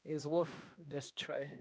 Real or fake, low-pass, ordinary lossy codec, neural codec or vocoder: fake; none; none; codec, 16 kHz, 1 kbps, X-Codec, HuBERT features, trained on balanced general audio